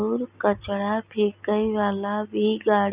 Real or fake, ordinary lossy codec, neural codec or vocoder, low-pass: real; Opus, 64 kbps; none; 3.6 kHz